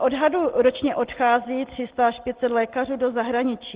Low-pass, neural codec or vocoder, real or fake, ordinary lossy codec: 3.6 kHz; none; real; Opus, 16 kbps